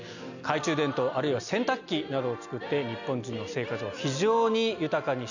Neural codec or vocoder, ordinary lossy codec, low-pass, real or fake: none; none; 7.2 kHz; real